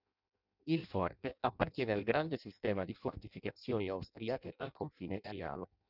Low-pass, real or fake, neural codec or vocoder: 5.4 kHz; fake; codec, 16 kHz in and 24 kHz out, 0.6 kbps, FireRedTTS-2 codec